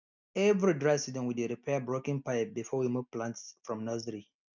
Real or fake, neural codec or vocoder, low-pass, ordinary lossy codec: real; none; 7.2 kHz; none